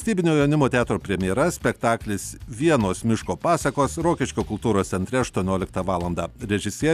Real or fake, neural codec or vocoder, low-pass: real; none; 14.4 kHz